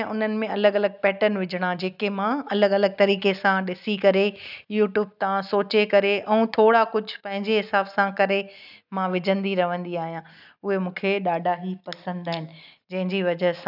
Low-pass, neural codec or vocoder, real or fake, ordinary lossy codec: 5.4 kHz; none; real; none